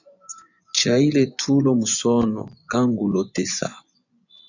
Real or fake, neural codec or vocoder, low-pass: fake; vocoder, 24 kHz, 100 mel bands, Vocos; 7.2 kHz